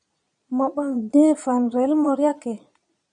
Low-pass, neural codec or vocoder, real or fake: 9.9 kHz; vocoder, 22.05 kHz, 80 mel bands, Vocos; fake